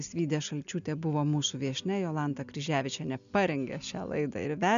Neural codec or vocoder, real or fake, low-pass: none; real; 7.2 kHz